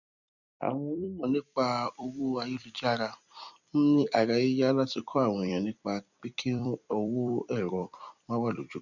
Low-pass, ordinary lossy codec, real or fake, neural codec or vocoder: 7.2 kHz; none; fake; vocoder, 44.1 kHz, 128 mel bands every 256 samples, BigVGAN v2